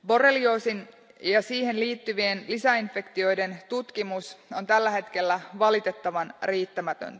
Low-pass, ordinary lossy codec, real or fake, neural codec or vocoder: none; none; real; none